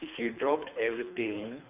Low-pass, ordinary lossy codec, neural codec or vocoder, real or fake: 3.6 kHz; none; codec, 24 kHz, 3 kbps, HILCodec; fake